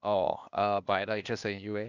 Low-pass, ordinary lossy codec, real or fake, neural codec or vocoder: 7.2 kHz; none; fake; codec, 16 kHz, 0.8 kbps, ZipCodec